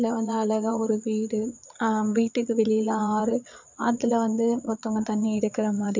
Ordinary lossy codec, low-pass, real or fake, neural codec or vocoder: MP3, 64 kbps; 7.2 kHz; fake; vocoder, 22.05 kHz, 80 mel bands, Vocos